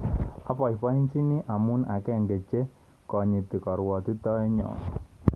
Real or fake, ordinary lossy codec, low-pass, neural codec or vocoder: real; Opus, 32 kbps; 19.8 kHz; none